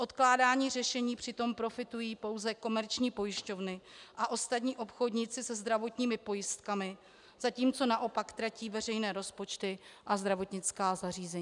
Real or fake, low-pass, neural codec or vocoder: real; 10.8 kHz; none